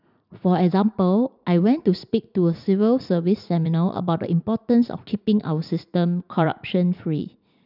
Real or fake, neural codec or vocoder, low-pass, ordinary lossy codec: fake; vocoder, 44.1 kHz, 80 mel bands, Vocos; 5.4 kHz; none